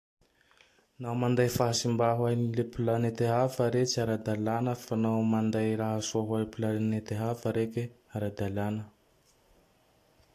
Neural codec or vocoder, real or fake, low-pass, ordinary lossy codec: none; real; 14.4 kHz; AAC, 64 kbps